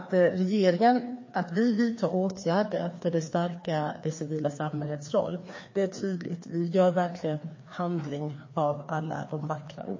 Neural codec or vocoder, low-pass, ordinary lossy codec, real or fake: codec, 16 kHz, 2 kbps, FreqCodec, larger model; 7.2 kHz; MP3, 32 kbps; fake